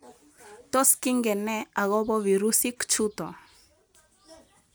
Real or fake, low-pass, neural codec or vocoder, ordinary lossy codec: real; none; none; none